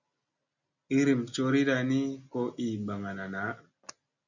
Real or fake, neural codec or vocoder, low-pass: real; none; 7.2 kHz